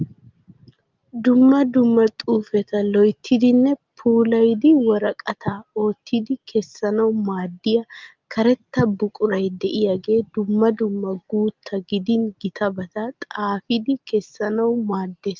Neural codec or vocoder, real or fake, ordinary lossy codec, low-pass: none; real; Opus, 32 kbps; 7.2 kHz